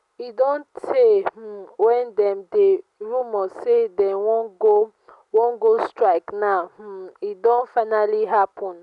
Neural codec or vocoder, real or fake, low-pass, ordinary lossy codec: none; real; 10.8 kHz; none